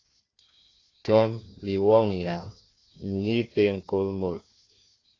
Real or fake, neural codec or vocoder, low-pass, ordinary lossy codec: fake; codec, 16 kHz, 1 kbps, FunCodec, trained on Chinese and English, 50 frames a second; 7.2 kHz; AAC, 32 kbps